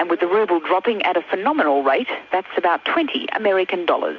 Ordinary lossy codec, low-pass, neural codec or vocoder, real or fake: MP3, 64 kbps; 7.2 kHz; none; real